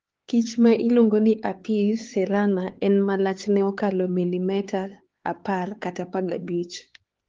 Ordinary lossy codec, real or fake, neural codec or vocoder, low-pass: Opus, 16 kbps; fake; codec, 16 kHz, 4 kbps, X-Codec, HuBERT features, trained on LibriSpeech; 7.2 kHz